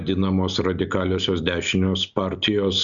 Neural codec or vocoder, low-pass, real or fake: none; 7.2 kHz; real